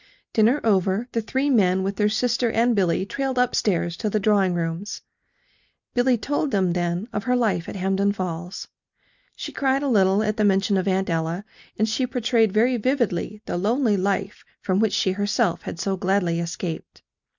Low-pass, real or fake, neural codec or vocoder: 7.2 kHz; real; none